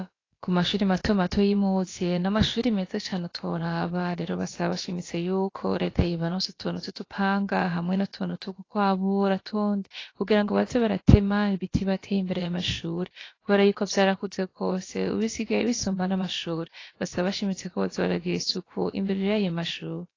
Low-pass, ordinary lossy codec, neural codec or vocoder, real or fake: 7.2 kHz; AAC, 32 kbps; codec, 16 kHz, about 1 kbps, DyCAST, with the encoder's durations; fake